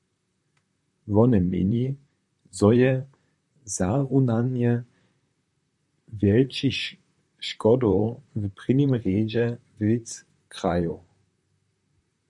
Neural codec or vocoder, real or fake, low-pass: vocoder, 44.1 kHz, 128 mel bands, Pupu-Vocoder; fake; 10.8 kHz